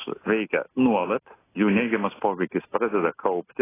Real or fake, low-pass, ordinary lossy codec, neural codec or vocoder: real; 3.6 kHz; AAC, 16 kbps; none